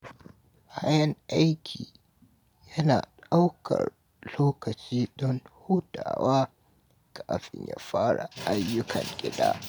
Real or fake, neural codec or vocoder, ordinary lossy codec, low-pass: real; none; none; none